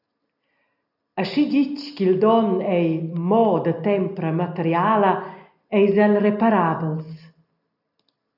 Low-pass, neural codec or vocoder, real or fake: 5.4 kHz; none; real